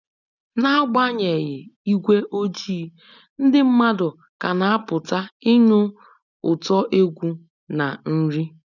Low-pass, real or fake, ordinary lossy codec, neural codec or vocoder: 7.2 kHz; real; none; none